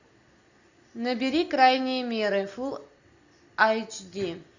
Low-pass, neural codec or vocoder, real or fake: 7.2 kHz; none; real